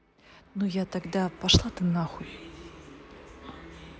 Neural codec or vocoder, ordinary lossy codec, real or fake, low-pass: none; none; real; none